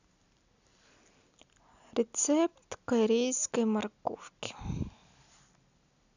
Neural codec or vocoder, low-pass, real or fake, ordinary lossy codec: none; 7.2 kHz; real; none